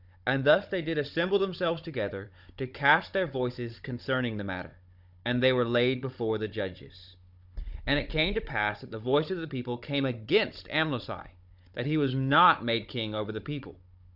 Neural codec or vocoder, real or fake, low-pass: codec, 16 kHz, 16 kbps, FunCodec, trained on Chinese and English, 50 frames a second; fake; 5.4 kHz